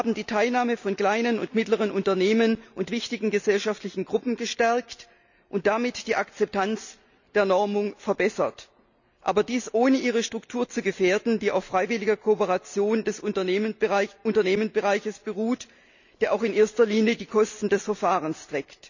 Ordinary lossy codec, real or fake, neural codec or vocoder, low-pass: none; real; none; 7.2 kHz